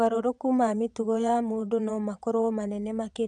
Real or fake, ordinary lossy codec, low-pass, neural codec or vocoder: fake; none; 9.9 kHz; vocoder, 22.05 kHz, 80 mel bands, WaveNeXt